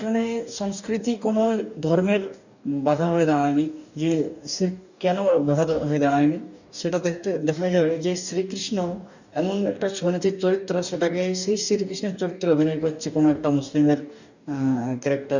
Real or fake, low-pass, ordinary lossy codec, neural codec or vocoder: fake; 7.2 kHz; none; codec, 44.1 kHz, 2.6 kbps, DAC